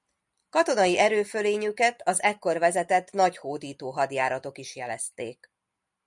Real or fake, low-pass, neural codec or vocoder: real; 10.8 kHz; none